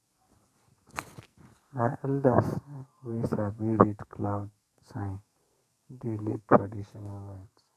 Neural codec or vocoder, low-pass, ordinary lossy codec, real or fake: codec, 44.1 kHz, 2.6 kbps, SNAC; 14.4 kHz; none; fake